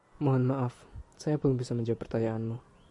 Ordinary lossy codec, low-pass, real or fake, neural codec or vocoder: AAC, 64 kbps; 10.8 kHz; real; none